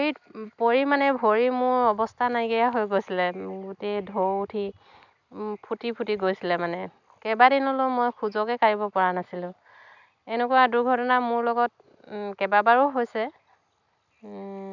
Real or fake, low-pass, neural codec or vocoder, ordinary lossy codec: real; 7.2 kHz; none; none